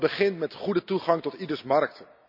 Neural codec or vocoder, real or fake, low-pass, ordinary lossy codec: none; real; 5.4 kHz; none